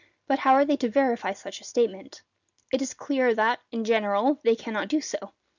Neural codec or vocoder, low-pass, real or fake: none; 7.2 kHz; real